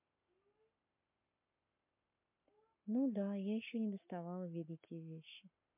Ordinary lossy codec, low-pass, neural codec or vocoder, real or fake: MP3, 32 kbps; 3.6 kHz; codec, 44.1 kHz, 7.8 kbps, Pupu-Codec; fake